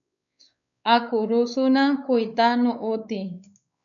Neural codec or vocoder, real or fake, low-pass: codec, 16 kHz, 4 kbps, X-Codec, WavLM features, trained on Multilingual LibriSpeech; fake; 7.2 kHz